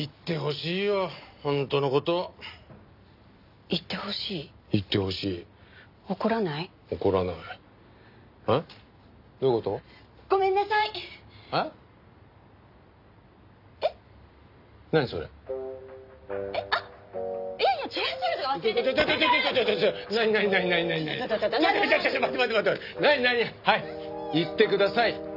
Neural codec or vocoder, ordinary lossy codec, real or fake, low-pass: none; none; real; 5.4 kHz